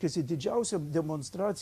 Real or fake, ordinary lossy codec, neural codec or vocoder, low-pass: fake; AAC, 96 kbps; vocoder, 44.1 kHz, 128 mel bands every 512 samples, BigVGAN v2; 14.4 kHz